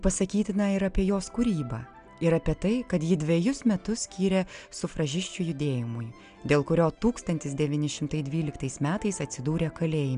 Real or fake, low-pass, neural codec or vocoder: real; 9.9 kHz; none